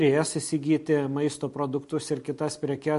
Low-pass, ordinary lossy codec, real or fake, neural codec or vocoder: 14.4 kHz; MP3, 48 kbps; real; none